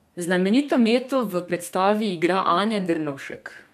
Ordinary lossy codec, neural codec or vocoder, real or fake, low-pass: none; codec, 32 kHz, 1.9 kbps, SNAC; fake; 14.4 kHz